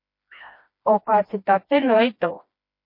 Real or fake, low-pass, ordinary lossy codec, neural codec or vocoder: fake; 5.4 kHz; MP3, 32 kbps; codec, 16 kHz, 1 kbps, FreqCodec, smaller model